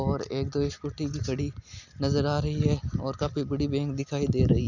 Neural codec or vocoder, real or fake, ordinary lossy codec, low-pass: none; real; none; 7.2 kHz